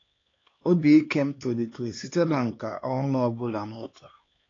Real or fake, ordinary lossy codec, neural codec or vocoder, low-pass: fake; AAC, 32 kbps; codec, 16 kHz, 2 kbps, X-Codec, HuBERT features, trained on LibriSpeech; 7.2 kHz